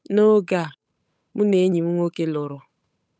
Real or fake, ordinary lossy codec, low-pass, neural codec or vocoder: fake; none; none; codec, 16 kHz, 8 kbps, FunCodec, trained on Chinese and English, 25 frames a second